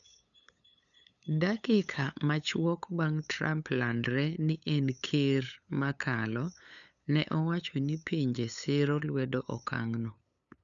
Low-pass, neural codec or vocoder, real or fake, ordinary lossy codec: 7.2 kHz; codec, 16 kHz, 8 kbps, FunCodec, trained on LibriTTS, 25 frames a second; fake; none